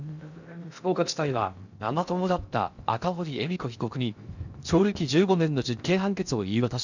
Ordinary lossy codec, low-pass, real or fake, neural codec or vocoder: none; 7.2 kHz; fake; codec, 16 kHz in and 24 kHz out, 0.6 kbps, FocalCodec, streaming, 2048 codes